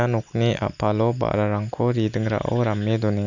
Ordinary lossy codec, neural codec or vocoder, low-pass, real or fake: none; none; 7.2 kHz; real